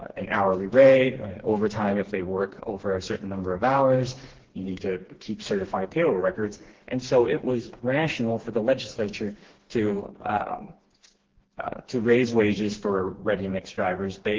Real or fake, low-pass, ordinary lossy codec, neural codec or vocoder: fake; 7.2 kHz; Opus, 16 kbps; codec, 16 kHz, 2 kbps, FreqCodec, smaller model